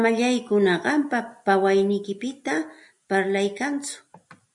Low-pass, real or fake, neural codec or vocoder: 10.8 kHz; real; none